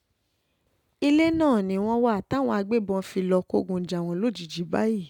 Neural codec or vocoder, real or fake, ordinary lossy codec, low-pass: none; real; none; 19.8 kHz